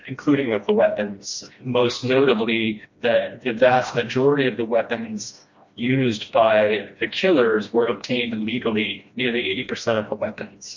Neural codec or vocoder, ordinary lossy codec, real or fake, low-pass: codec, 16 kHz, 1 kbps, FreqCodec, smaller model; MP3, 48 kbps; fake; 7.2 kHz